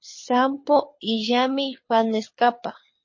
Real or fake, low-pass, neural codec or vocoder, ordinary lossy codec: fake; 7.2 kHz; codec, 24 kHz, 6 kbps, HILCodec; MP3, 32 kbps